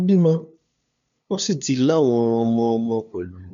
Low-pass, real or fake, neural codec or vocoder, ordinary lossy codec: 7.2 kHz; fake; codec, 16 kHz, 2 kbps, FunCodec, trained on LibriTTS, 25 frames a second; none